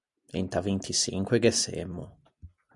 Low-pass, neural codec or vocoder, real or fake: 10.8 kHz; none; real